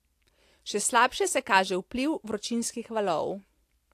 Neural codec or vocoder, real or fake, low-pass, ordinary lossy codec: none; real; 14.4 kHz; AAC, 64 kbps